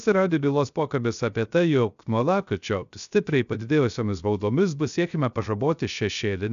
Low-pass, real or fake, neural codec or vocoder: 7.2 kHz; fake; codec, 16 kHz, 0.3 kbps, FocalCodec